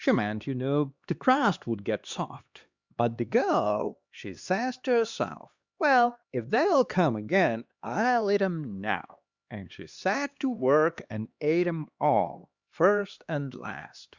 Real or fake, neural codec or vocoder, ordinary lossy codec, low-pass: fake; codec, 16 kHz, 2 kbps, X-Codec, HuBERT features, trained on LibriSpeech; Opus, 64 kbps; 7.2 kHz